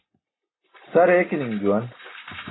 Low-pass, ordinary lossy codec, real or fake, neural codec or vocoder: 7.2 kHz; AAC, 16 kbps; real; none